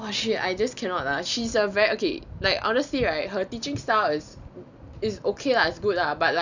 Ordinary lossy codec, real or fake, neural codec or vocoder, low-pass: none; real; none; 7.2 kHz